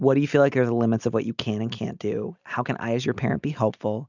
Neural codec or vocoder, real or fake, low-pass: none; real; 7.2 kHz